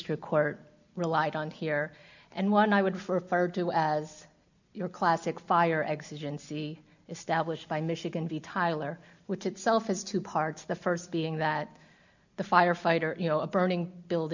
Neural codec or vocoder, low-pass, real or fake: none; 7.2 kHz; real